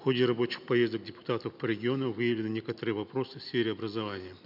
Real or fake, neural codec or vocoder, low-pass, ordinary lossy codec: real; none; 5.4 kHz; none